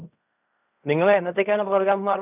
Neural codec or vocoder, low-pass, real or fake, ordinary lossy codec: codec, 16 kHz in and 24 kHz out, 0.4 kbps, LongCat-Audio-Codec, fine tuned four codebook decoder; 3.6 kHz; fake; none